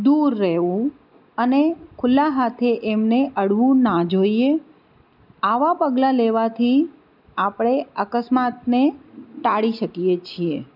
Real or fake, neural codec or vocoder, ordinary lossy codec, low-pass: real; none; none; 5.4 kHz